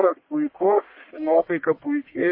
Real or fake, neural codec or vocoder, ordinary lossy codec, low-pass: fake; codec, 44.1 kHz, 1.7 kbps, Pupu-Codec; MP3, 24 kbps; 5.4 kHz